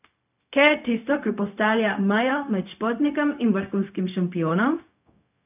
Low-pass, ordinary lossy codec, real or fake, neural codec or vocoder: 3.6 kHz; none; fake; codec, 16 kHz, 0.4 kbps, LongCat-Audio-Codec